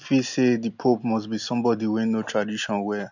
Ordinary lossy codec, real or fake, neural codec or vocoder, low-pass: none; real; none; 7.2 kHz